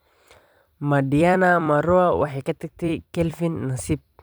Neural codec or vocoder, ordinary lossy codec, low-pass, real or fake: vocoder, 44.1 kHz, 128 mel bands every 256 samples, BigVGAN v2; none; none; fake